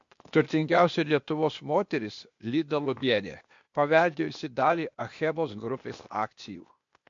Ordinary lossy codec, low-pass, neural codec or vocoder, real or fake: MP3, 48 kbps; 7.2 kHz; codec, 16 kHz, 0.8 kbps, ZipCodec; fake